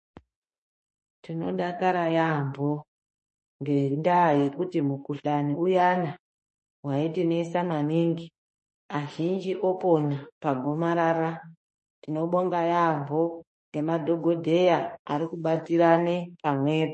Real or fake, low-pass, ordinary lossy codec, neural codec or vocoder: fake; 10.8 kHz; MP3, 32 kbps; autoencoder, 48 kHz, 32 numbers a frame, DAC-VAE, trained on Japanese speech